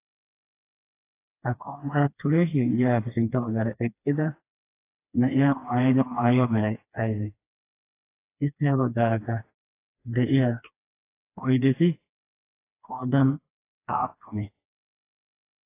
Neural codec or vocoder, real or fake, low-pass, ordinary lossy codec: codec, 16 kHz, 2 kbps, FreqCodec, smaller model; fake; 3.6 kHz; AAC, 24 kbps